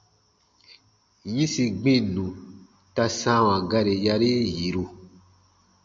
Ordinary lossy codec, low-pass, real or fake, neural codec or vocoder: MP3, 48 kbps; 7.2 kHz; real; none